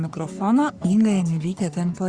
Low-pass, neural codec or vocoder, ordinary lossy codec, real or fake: 9.9 kHz; codec, 44.1 kHz, 3.4 kbps, Pupu-Codec; Opus, 64 kbps; fake